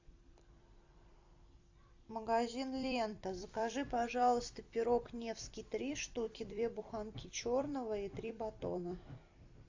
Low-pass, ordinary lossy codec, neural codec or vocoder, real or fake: 7.2 kHz; AAC, 48 kbps; vocoder, 44.1 kHz, 128 mel bands every 256 samples, BigVGAN v2; fake